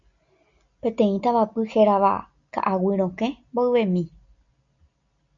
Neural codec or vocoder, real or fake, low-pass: none; real; 7.2 kHz